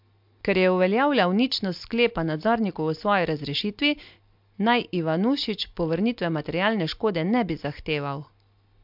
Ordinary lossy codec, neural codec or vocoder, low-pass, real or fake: MP3, 48 kbps; none; 5.4 kHz; real